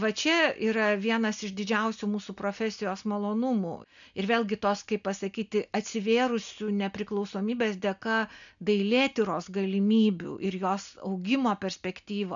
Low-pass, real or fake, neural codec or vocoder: 7.2 kHz; real; none